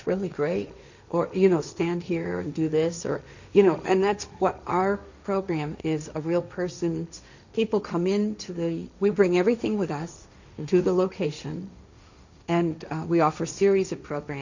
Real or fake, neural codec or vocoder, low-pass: fake; codec, 16 kHz, 1.1 kbps, Voila-Tokenizer; 7.2 kHz